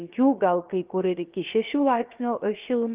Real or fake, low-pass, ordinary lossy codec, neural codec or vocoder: fake; 3.6 kHz; Opus, 32 kbps; codec, 16 kHz, 0.7 kbps, FocalCodec